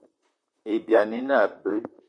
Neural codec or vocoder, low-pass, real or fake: vocoder, 44.1 kHz, 128 mel bands, Pupu-Vocoder; 9.9 kHz; fake